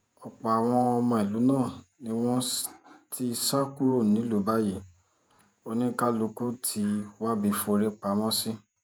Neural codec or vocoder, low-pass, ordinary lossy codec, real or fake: vocoder, 48 kHz, 128 mel bands, Vocos; none; none; fake